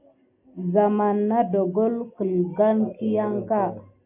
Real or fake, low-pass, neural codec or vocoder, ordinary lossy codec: real; 3.6 kHz; none; MP3, 32 kbps